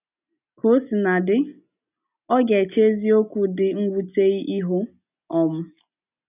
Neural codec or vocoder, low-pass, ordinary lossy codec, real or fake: none; 3.6 kHz; none; real